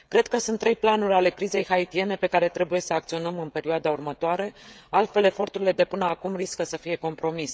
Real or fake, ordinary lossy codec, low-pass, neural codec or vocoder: fake; none; none; codec, 16 kHz, 16 kbps, FreqCodec, smaller model